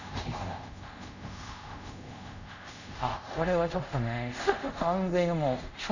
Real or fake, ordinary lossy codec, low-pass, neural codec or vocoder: fake; none; 7.2 kHz; codec, 24 kHz, 0.5 kbps, DualCodec